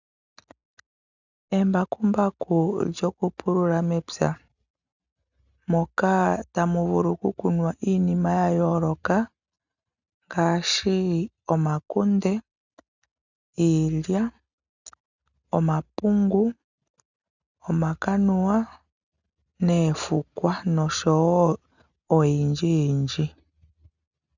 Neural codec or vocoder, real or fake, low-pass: none; real; 7.2 kHz